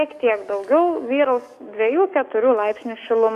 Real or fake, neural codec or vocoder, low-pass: fake; codec, 44.1 kHz, 7.8 kbps, DAC; 14.4 kHz